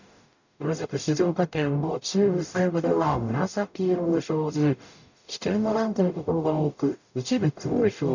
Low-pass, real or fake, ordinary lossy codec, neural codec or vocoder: 7.2 kHz; fake; none; codec, 44.1 kHz, 0.9 kbps, DAC